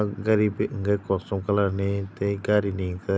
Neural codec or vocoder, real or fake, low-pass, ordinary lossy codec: none; real; none; none